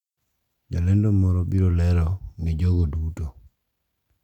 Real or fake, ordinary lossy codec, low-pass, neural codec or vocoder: real; none; 19.8 kHz; none